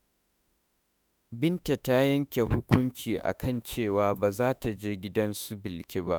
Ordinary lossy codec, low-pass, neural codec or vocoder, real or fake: none; none; autoencoder, 48 kHz, 32 numbers a frame, DAC-VAE, trained on Japanese speech; fake